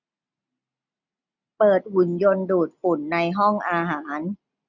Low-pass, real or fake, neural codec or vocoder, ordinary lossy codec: 7.2 kHz; real; none; none